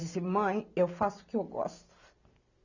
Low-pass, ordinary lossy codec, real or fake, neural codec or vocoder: 7.2 kHz; none; real; none